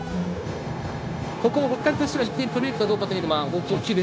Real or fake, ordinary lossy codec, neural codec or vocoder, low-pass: fake; none; codec, 16 kHz, 0.9 kbps, LongCat-Audio-Codec; none